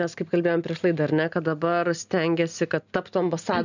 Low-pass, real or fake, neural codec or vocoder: 7.2 kHz; real; none